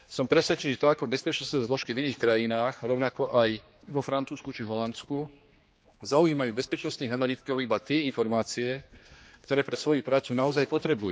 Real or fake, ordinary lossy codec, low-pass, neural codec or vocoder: fake; none; none; codec, 16 kHz, 2 kbps, X-Codec, HuBERT features, trained on general audio